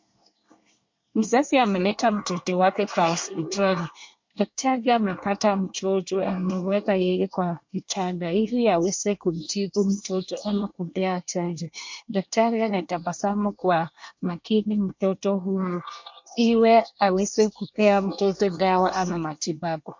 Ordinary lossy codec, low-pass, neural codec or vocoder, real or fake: MP3, 48 kbps; 7.2 kHz; codec, 24 kHz, 1 kbps, SNAC; fake